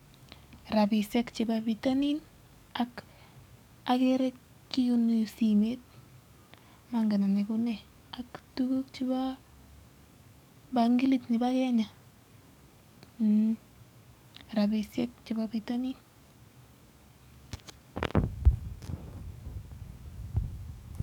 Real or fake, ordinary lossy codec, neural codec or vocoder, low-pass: fake; none; codec, 44.1 kHz, 7.8 kbps, DAC; 19.8 kHz